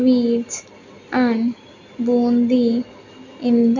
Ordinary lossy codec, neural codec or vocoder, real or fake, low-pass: none; none; real; 7.2 kHz